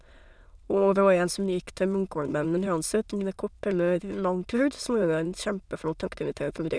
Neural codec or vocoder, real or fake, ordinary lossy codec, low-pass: autoencoder, 22.05 kHz, a latent of 192 numbers a frame, VITS, trained on many speakers; fake; none; none